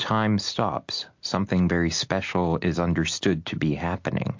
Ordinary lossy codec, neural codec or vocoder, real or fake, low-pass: MP3, 48 kbps; none; real; 7.2 kHz